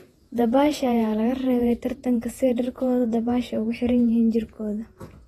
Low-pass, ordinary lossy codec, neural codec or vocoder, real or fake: 19.8 kHz; AAC, 32 kbps; vocoder, 48 kHz, 128 mel bands, Vocos; fake